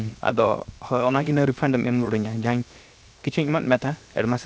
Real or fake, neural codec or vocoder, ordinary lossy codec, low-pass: fake; codec, 16 kHz, 0.7 kbps, FocalCodec; none; none